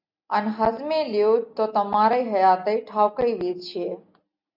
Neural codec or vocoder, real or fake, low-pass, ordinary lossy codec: none; real; 5.4 kHz; MP3, 48 kbps